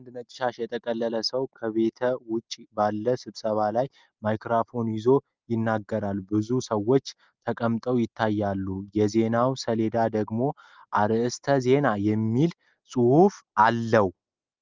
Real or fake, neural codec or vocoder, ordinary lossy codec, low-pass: real; none; Opus, 32 kbps; 7.2 kHz